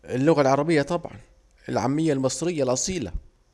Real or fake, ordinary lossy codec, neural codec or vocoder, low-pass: real; none; none; none